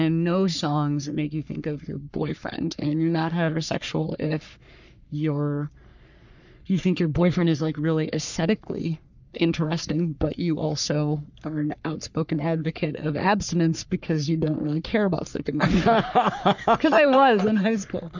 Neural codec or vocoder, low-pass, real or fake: codec, 44.1 kHz, 3.4 kbps, Pupu-Codec; 7.2 kHz; fake